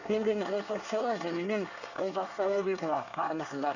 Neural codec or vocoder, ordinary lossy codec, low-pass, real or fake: codec, 24 kHz, 1 kbps, SNAC; none; 7.2 kHz; fake